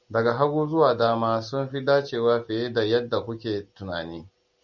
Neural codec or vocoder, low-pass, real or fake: none; 7.2 kHz; real